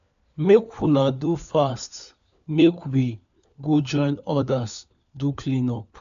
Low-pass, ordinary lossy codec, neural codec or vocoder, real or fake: 7.2 kHz; AAC, 96 kbps; codec, 16 kHz, 4 kbps, FunCodec, trained on LibriTTS, 50 frames a second; fake